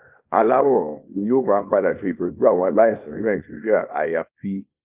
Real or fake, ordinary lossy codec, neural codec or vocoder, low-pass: fake; Opus, 32 kbps; codec, 24 kHz, 0.9 kbps, WavTokenizer, small release; 3.6 kHz